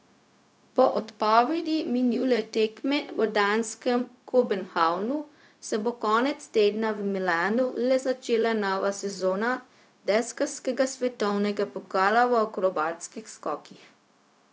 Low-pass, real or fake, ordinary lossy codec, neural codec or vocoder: none; fake; none; codec, 16 kHz, 0.4 kbps, LongCat-Audio-Codec